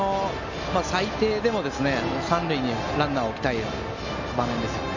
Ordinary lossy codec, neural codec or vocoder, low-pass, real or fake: none; none; 7.2 kHz; real